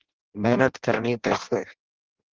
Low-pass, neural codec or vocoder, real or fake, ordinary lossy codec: 7.2 kHz; codec, 16 kHz in and 24 kHz out, 0.6 kbps, FireRedTTS-2 codec; fake; Opus, 16 kbps